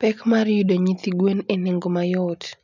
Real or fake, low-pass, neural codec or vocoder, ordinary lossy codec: real; 7.2 kHz; none; none